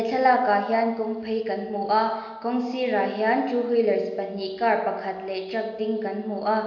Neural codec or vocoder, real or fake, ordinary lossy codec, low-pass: none; real; AAC, 48 kbps; 7.2 kHz